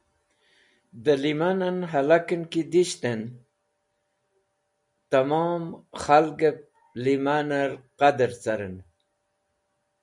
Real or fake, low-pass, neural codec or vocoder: real; 10.8 kHz; none